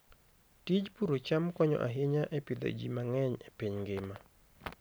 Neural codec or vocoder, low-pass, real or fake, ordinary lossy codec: none; none; real; none